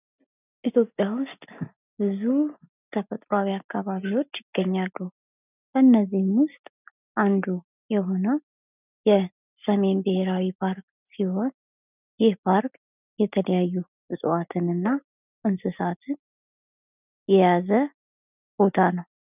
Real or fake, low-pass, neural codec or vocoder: real; 3.6 kHz; none